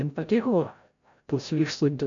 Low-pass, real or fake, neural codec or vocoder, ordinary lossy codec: 7.2 kHz; fake; codec, 16 kHz, 0.5 kbps, FreqCodec, larger model; MP3, 64 kbps